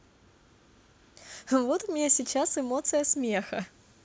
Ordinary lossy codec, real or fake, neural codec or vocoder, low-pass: none; real; none; none